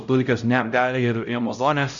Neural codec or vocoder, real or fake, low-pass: codec, 16 kHz, 0.5 kbps, X-Codec, HuBERT features, trained on LibriSpeech; fake; 7.2 kHz